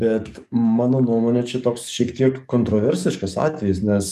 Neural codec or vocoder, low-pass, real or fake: codec, 44.1 kHz, 7.8 kbps, DAC; 14.4 kHz; fake